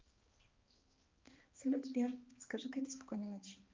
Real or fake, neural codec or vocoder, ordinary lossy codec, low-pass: fake; codec, 16 kHz, 2 kbps, X-Codec, HuBERT features, trained on balanced general audio; Opus, 24 kbps; 7.2 kHz